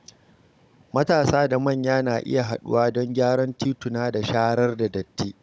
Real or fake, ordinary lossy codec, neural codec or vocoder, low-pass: fake; none; codec, 16 kHz, 16 kbps, FunCodec, trained on Chinese and English, 50 frames a second; none